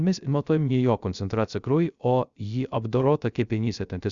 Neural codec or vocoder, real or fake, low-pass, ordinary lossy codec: codec, 16 kHz, 0.3 kbps, FocalCodec; fake; 7.2 kHz; Opus, 64 kbps